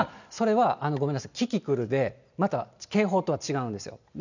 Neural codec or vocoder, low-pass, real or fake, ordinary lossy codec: none; 7.2 kHz; real; none